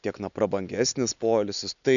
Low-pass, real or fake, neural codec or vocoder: 7.2 kHz; real; none